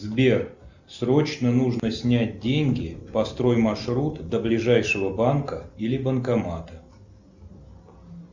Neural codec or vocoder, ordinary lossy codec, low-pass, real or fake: none; Opus, 64 kbps; 7.2 kHz; real